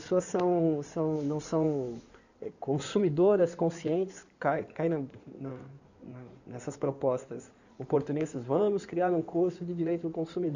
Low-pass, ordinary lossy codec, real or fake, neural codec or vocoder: 7.2 kHz; none; fake; codec, 16 kHz in and 24 kHz out, 2.2 kbps, FireRedTTS-2 codec